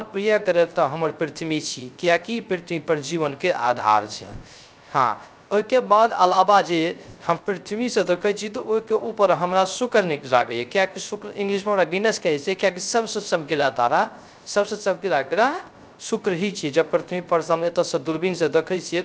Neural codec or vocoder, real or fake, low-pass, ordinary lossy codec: codec, 16 kHz, 0.3 kbps, FocalCodec; fake; none; none